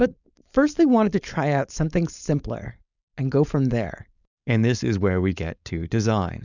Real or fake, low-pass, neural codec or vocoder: fake; 7.2 kHz; codec, 16 kHz, 4.8 kbps, FACodec